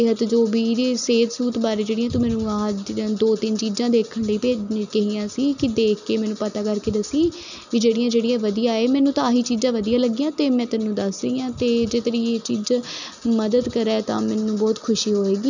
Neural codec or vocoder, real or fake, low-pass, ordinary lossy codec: none; real; 7.2 kHz; none